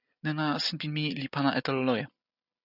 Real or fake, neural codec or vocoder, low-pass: real; none; 5.4 kHz